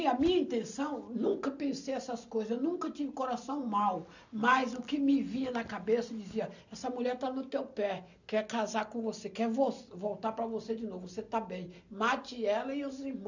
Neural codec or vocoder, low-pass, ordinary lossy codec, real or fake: none; 7.2 kHz; none; real